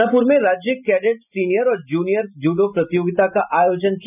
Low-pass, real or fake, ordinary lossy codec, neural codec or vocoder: 3.6 kHz; real; none; none